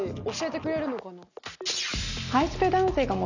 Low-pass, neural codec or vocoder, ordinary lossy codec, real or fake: 7.2 kHz; none; none; real